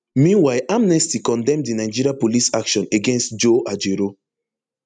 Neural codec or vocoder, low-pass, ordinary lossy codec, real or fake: none; 9.9 kHz; none; real